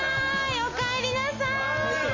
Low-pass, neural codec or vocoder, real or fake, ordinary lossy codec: 7.2 kHz; none; real; MP3, 32 kbps